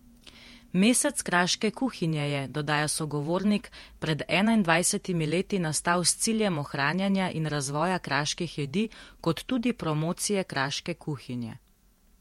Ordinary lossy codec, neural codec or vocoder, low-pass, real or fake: MP3, 64 kbps; vocoder, 48 kHz, 128 mel bands, Vocos; 19.8 kHz; fake